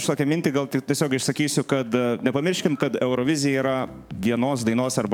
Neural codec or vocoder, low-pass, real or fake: codec, 44.1 kHz, 7.8 kbps, DAC; 19.8 kHz; fake